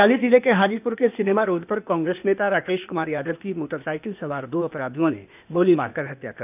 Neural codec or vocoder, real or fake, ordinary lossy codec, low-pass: codec, 16 kHz, 0.8 kbps, ZipCodec; fake; none; 3.6 kHz